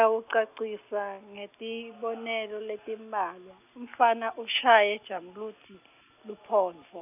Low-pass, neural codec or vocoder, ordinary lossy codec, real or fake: 3.6 kHz; none; none; real